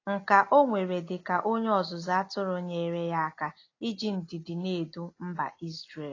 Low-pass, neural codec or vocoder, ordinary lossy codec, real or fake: 7.2 kHz; none; AAC, 32 kbps; real